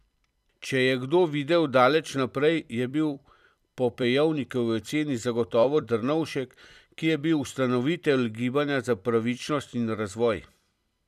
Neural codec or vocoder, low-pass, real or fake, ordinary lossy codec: vocoder, 44.1 kHz, 128 mel bands every 256 samples, BigVGAN v2; 14.4 kHz; fake; none